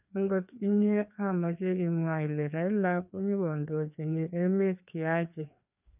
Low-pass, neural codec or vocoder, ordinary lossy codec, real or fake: 3.6 kHz; codec, 16 kHz, 2 kbps, FreqCodec, larger model; none; fake